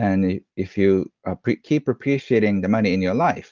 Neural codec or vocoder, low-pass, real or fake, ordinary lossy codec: none; 7.2 kHz; real; Opus, 32 kbps